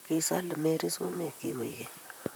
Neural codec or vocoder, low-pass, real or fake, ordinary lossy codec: vocoder, 44.1 kHz, 128 mel bands, Pupu-Vocoder; none; fake; none